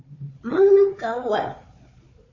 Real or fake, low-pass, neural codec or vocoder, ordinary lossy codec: fake; 7.2 kHz; codec, 16 kHz, 4 kbps, FunCodec, trained on Chinese and English, 50 frames a second; MP3, 32 kbps